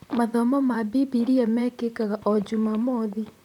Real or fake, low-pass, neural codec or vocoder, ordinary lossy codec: fake; 19.8 kHz; vocoder, 44.1 kHz, 128 mel bands, Pupu-Vocoder; none